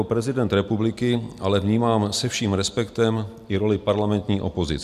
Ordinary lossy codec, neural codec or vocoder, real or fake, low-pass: MP3, 96 kbps; none; real; 14.4 kHz